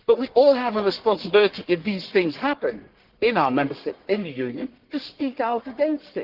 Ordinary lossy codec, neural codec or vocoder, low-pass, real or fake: Opus, 16 kbps; codec, 44.1 kHz, 1.7 kbps, Pupu-Codec; 5.4 kHz; fake